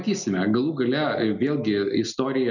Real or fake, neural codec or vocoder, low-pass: real; none; 7.2 kHz